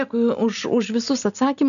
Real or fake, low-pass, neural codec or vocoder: real; 7.2 kHz; none